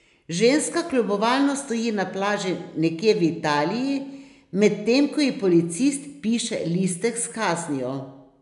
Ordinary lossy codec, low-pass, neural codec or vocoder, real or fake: none; 10.8 kHz; none; real